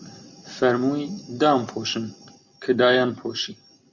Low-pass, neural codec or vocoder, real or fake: 7.2 kHz; none; real